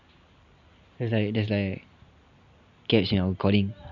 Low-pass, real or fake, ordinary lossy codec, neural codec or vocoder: 7.2 kHz; real; none; none